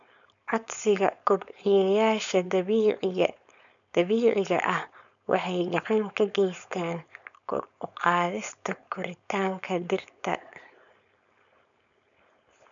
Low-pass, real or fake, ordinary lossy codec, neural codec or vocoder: 7.2 kHz; fake; none; codec, 16 kHz, 4.8 kbps, FACodec